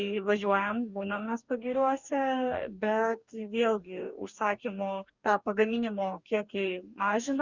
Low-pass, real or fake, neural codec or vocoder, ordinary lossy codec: 7.2 kHz; fake; codec, 44.1 kHz, 2.6 kbps, DAC; Opus, 64 kbps